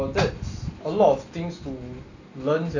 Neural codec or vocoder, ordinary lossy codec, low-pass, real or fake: none; none; 7.2 kHz; real